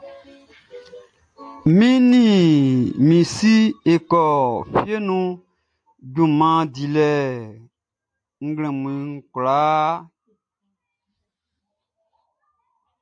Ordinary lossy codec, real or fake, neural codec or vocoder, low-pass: AAC, 64 kbps; real; none; 9.9 kHz